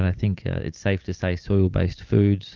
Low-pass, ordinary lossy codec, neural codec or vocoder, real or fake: 7.2 kHz; Opus, 24 kbps; none; real